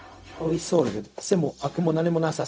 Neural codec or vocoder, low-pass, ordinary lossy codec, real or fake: codec, 16 kHz, 0.4 kbps, LongCat-Audio-Codec; none; none; fake